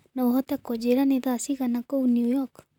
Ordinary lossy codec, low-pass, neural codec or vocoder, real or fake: none; 19.8 kHz; vocoder, 44.1 kHz, 128 mel bands, Pupu-Vocoder; fake